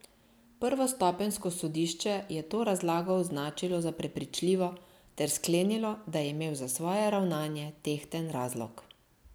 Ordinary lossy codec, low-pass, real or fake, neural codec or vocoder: none; none; real; none